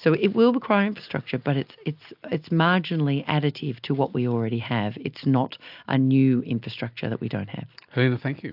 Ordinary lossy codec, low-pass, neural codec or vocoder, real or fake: AAC, 48 kbps; 5.4 kHz; none; real